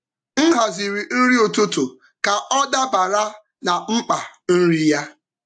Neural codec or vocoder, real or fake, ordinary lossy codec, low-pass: none; real; AAC, 64 kbps; 9.9 kHz